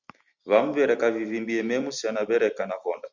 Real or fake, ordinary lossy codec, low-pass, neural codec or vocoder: real; Opus, 64 kbps; 7.2 kHz; none